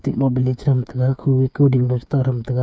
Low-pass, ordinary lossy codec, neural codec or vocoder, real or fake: none; none; codec, 16 kHz, 8 kbps, FreqCodec, smaller model; fake